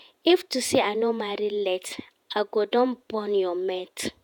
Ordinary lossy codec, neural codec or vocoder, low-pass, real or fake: none; vocoder, 48 kHz, 128 mel bands, Vocos; 19.8 kHz; fake